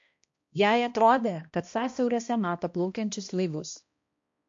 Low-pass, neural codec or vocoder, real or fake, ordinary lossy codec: 7.2 kHz; codec, 16 kHz, 1 kbps, X-Codec, HuBERT features, trained on balanced general audio; fake; MP3, 48 kbps